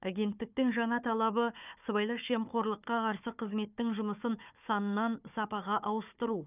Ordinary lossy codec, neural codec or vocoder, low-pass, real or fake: none; codec, 16 kHz, 4 kbps, FunCodec, trained on Chinese and English, 50 frames a second; 3.6 kHz; fake